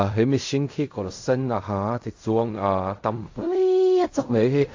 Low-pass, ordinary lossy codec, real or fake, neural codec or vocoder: 7.2 kHz; AAC, 48 kbps; fake; codec, 16 kHz in and 24 kHz out, 0.4 kbps, LongCat-Audio-Codec, fine tuned four codebook decoder